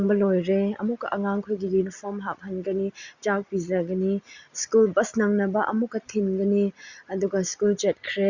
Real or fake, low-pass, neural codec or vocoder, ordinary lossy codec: real; 7.2 kHz; none; Opus, 64 kbps